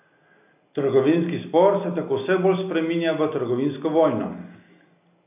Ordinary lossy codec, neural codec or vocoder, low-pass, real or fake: AAC, 32 kbps; none; 3.6 kHz; real